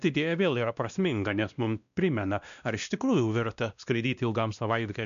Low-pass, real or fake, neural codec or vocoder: 7.2 kHz; fake; codec, 16 kHz, 1 kbps, X-Codec, WavLM features, trained on Multilingual LibriSpeech